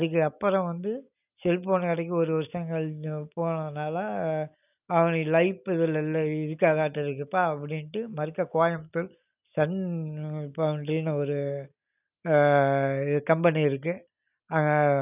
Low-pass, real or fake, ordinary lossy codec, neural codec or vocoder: 3.6 kHz; real; none; none